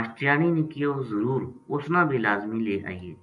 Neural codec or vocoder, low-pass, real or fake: none; 10.8 kHz; real